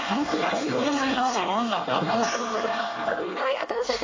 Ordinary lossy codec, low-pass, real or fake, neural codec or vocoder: AAC, 32 kbps; 7.2 kHz; fake; codec, 24 kHz, 1 kbps, SNAC